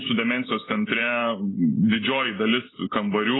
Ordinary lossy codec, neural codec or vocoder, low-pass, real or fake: AAC, 16 kbps; none; 7.2 kHz; real